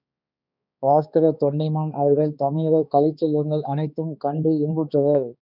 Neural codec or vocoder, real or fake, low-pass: codec, 16 kHz, 2 kbps, X-Codec, HuBERT features, trained on balanced general audio; fake; 5.4 kHz